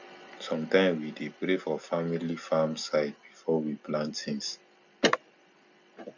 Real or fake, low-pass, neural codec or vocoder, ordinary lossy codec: real; 7.2 kHz; none; none